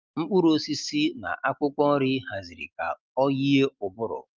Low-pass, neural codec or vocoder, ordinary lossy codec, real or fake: 7.2 kHz; none; Opus, 32 kbps; real